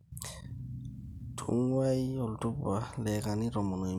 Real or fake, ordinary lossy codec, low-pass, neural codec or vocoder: real; none; 19.8 kHz; none